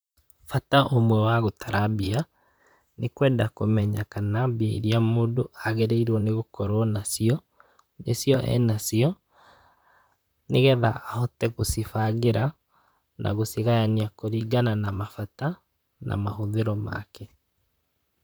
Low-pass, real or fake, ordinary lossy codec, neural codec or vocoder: none; fake; none; vocoder, 44.1 kHz, 128 mel bands, Pupu-Vocoder